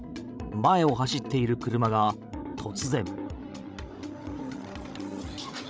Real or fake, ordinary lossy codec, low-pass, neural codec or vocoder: fake; none; none; codec, 16 kHz, 16 kbps, FreqCodec, larger model